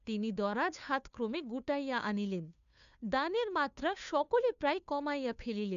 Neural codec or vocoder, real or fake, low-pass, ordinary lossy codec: codec, 16 kHz, 2 kbps, FunCodec, trained on Chinese and English, 25 frames a second; fake; 7.2 kHz; none